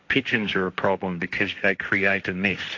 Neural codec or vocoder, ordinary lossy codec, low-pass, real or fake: codec, 16 kHz, 1.1 kbps, Voila-Tokenizer; AAC, 48 kbps; 7.2 kHz; fake